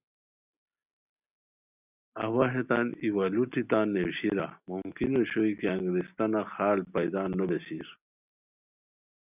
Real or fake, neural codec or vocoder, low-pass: real; none; 3.6 kHz